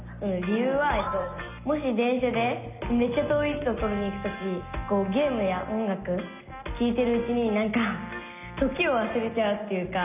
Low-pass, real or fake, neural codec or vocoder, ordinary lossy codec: 3.6 kHz; real; none; none